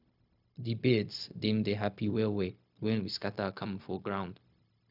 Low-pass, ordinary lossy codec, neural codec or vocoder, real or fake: 5.4 kHz; none; codec, 16 kHz, 0.4 kbps, LongCat-Audio-Codec; fake